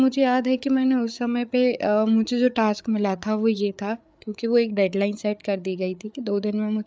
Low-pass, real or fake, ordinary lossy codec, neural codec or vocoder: none; fake; none; codec, 16 kHz, 8 kbps, FreqCodec, larger model